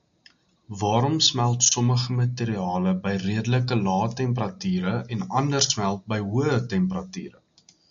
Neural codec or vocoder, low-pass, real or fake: none; 7.2 kHz; real